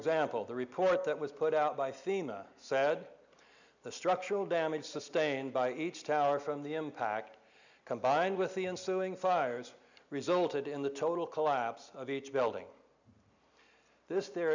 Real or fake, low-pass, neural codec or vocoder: real; 7.2 kHz; none